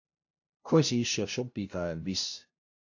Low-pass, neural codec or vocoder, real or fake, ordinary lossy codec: 7.2 kHz; codec, 16 kHz, 0.5 kbps, FunCodec, trained on LibriTTS, 25 frames a second; fake; AAC, 48 kbps